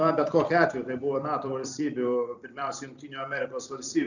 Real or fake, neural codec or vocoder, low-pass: fake; vocoder, 44.1 kHz, 128 mel bands every 256 samples, BigVGAN v2; 7.2 kHz